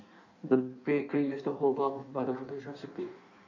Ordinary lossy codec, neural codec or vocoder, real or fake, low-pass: none; codec, 16 kHz in and 24 kHz out, 1.1 kbps, FireRedTTS-2 codec; fake; 7.2 kHz